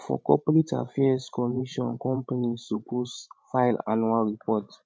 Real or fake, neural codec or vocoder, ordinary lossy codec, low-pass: fake; codec, 16 kHz, 16 kbps, FreqCodec, larger model; none; none